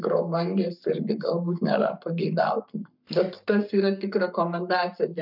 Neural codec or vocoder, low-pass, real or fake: codec, 44.1 kHz, 7.8 kbps, Pupu-Codec; 5.4 kHz; fake